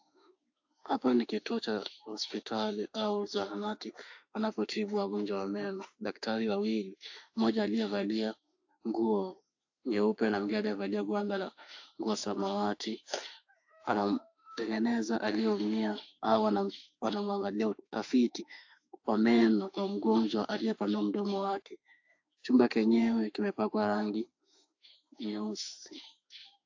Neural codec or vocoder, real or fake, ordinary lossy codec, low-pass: autoencoder, 48 kHz, 32 numbers a frame, DAC-VAE, trained on Japanese speech; fake; AAC, 48 kbps; 7.2 kHz